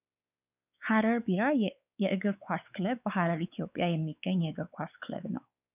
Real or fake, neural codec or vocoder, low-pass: fake; codec, 16 kHz, 4 kbps, X-Codec, WavLM features, trained on Multilingual LibriSpeech; 3.6 kHz